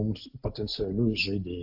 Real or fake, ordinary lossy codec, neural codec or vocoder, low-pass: real; AAC, 48 kbps; none; 5.4 kHz